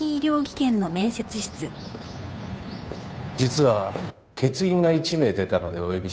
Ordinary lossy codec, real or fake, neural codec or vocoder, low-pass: none; fake; codec, 16 kHz, 2 kbps, FunCodec, trained on Chinese and English, 25 frames a second; none